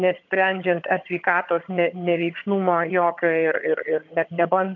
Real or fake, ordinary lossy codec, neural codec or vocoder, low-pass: fake; MP3, 64 kbps; vocoder, 22.05 kHz, 80 mel bands, HiFi-GAN; 7.2 kHz